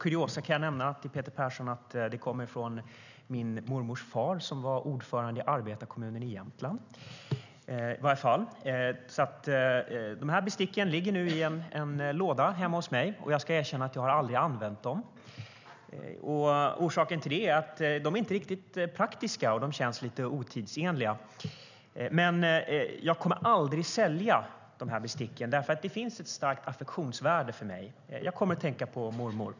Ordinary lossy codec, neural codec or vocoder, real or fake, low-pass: none; none; real; 7.2 kHz